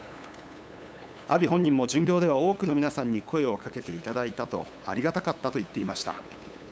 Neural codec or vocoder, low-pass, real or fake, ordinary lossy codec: codec, 16 kHz, 8 kbps, FunCodec, trained on LibriTTS, 25 frames a second; none; fake; none